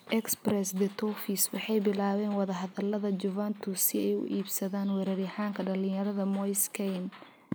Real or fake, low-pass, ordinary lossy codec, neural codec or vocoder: real; none; none; none